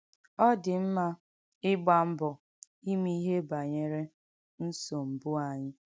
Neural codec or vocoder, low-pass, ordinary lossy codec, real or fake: none; none; none; real